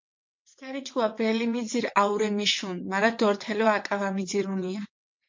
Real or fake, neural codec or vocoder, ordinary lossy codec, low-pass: fake; vocoder, 22.05 kHz, 80 mel bands, WaveNeXt; MP3, 48 kbps; 7.2 kHz